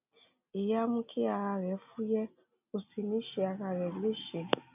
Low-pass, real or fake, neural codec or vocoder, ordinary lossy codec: 3.6 kHz; real; none; none